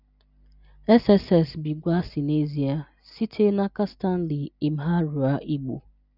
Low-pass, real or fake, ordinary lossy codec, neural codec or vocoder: 5.4 kHz; real; none; none